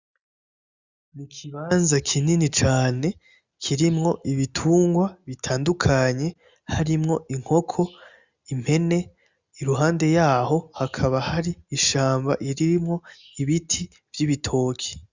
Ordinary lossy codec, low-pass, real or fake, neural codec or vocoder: Opus, 64 kbps; 7.2 kHz; real; none